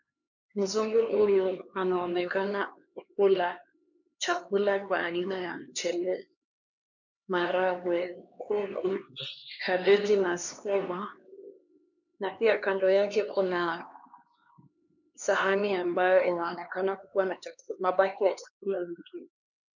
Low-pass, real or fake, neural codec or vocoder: 7.2 kHz; fake; codec, 16 kHz, 2 kbps, X-Codec, HuBERT features, trained on LibriSpeech